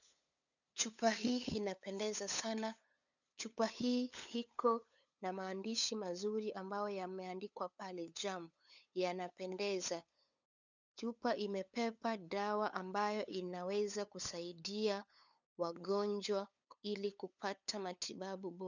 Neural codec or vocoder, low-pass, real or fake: codec, 16 kHz, 8 kbps, FunCodec, trained on LibriTTS, 25 frames a second; 7.2 kHz; fake